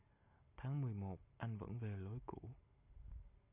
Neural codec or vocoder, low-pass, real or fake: none; 3.6 kHz; real